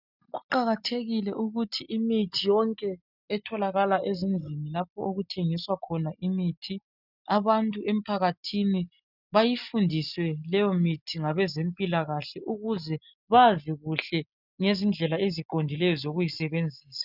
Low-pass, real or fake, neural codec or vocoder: 5.4 kHz; real; none